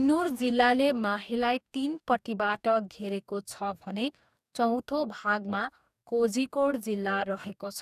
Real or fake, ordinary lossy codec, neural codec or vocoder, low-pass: fake; none; codec, 44.1 kHz, 2.6 kbps, DAC; 14.4 kHz